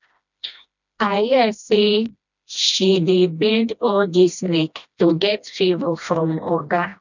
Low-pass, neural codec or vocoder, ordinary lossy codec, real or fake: 7.2 kHz; codec, 16 kHz, 1 kbps, FreqCodec, smaller model; none; fake